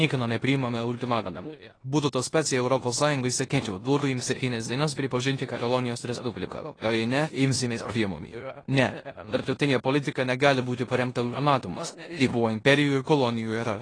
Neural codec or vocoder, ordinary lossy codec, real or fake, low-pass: codec, 16 kHz in and 24 kHz out, 0.9 kbps, LongCat-Audio-Codec, four codebook decoder; AAC, 32 kbps; fake; 9.9 kHz